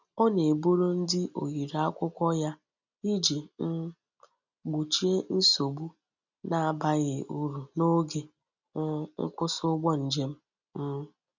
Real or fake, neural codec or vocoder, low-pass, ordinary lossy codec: real; none; 7.2 kHz; none